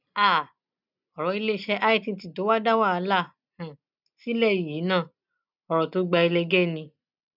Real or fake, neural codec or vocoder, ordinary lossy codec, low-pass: real; none; none; 5.4 kHz